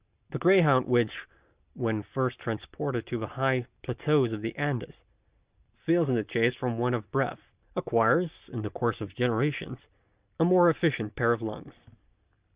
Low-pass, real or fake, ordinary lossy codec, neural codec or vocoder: 3.6 kHz; real; Opus, 64 kbps; none